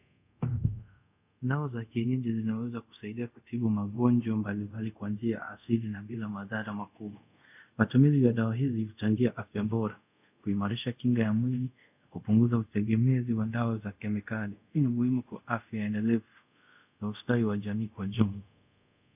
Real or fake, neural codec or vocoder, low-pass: fake; codec, 24 kHz, 0.5 kbps, DualCodec; 3.6 kHz